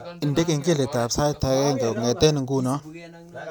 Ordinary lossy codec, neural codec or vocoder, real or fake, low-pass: none; none; real; none